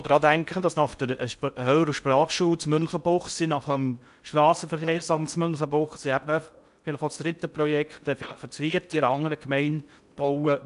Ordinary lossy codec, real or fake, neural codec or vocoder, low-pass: none; fake; codec, 16 kHz in and 24 kHz out, 0.6 kbps, FocalCodec, streaming, 4096 codes; 10.8 kHz